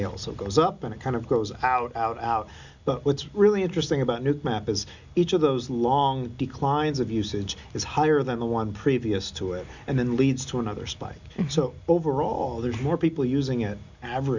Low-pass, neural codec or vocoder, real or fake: 7.2 kHz; none; real